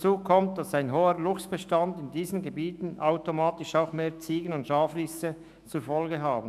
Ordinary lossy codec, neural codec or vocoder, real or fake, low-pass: none; autoencoder, 48 kHz, 128 numbers a frame, DAC-VAE, trained on Japanese speech; fake; 14.4 kHz